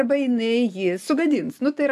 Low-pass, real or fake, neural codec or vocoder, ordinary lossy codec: 14.4 kHz; real; none; AAC, 96 kbps